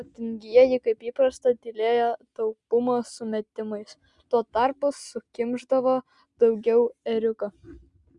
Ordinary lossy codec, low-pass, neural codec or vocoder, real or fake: Opus, 64 kbps; 10.8 kHz; none; real